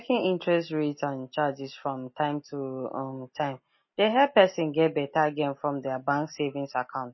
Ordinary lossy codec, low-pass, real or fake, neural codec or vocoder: MP3, 24 kbps; 7.2 kHz; real; none